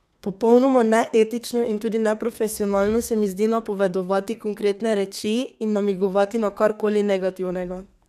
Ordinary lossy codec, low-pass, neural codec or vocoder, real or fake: none; 14.4 kHz; codec, 32 kHz, 1.9 kbps, SNAC; fake